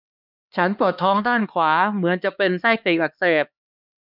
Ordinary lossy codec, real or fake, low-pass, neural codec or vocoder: none; fake; 5.4 kHz; codec, 16 kHz, 2 kbps, X-Codec, HuBERT features, trained on LibriSpeech